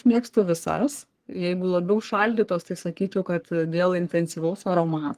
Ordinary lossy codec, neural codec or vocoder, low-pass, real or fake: Opus, 24 kbps; codec, 44.1 kHz, 3.4 kbps, Pupu-Codec; 14.4 kHz; fake